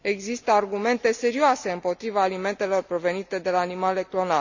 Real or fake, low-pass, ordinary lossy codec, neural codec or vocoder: real; 7.2 kHz; none; none